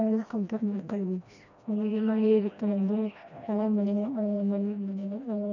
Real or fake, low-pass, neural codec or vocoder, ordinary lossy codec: fake; 7.2 kHz; codec, 16 kHz, 1 kbps, FreqCodec, smaller model; none